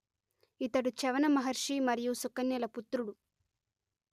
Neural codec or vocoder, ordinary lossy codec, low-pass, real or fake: none; none; 14.4 kHz; real